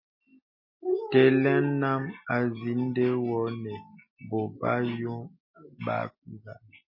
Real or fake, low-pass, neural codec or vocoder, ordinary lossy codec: real; 5.4 kHz; none; MP3, 24 kbps